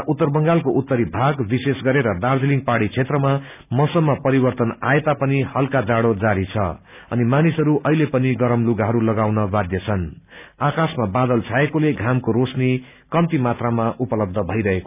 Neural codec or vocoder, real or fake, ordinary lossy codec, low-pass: none; real; none; 3.6 kHz